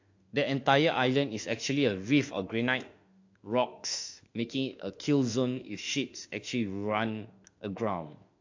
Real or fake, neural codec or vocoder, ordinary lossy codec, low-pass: fake; autoencoder, 48 kHz, 32 numbers a frame, DAC-VAE, trained on Japanese speech; AAC, 48 kbps; 7.2 kHz